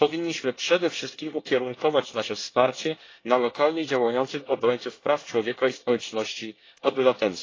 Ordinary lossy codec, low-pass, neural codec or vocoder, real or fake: AAC, 32 kbps; 7.2 kHz; codec, 24 kHz, 1 kbps, SNAC; fake